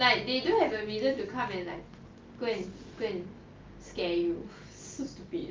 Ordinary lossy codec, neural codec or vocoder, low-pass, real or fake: Opus, 32 kbps; none; 7.2 kHz; real